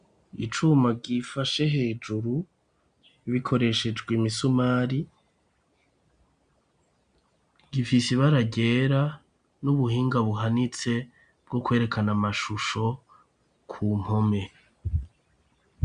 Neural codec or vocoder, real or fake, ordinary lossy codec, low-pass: none; real; AAC, 96 kbps; 9.9 kHz